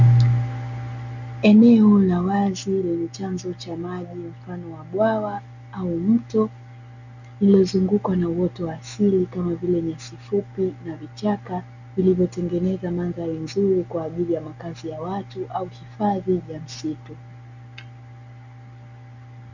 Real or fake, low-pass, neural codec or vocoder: real; 7.2 kHz; none